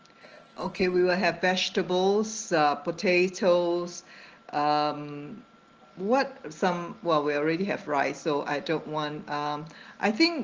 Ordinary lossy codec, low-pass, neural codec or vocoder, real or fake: Opus, 16 kbps; 7.2 kHz; none; real